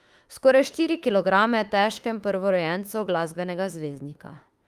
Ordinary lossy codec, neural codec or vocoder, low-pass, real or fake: Opus, 32 kbps; autoencoder, 48 kHz, 32 numbers a frame, DAC-VAE, trained on Japanese speech; 14.4 kHz; fake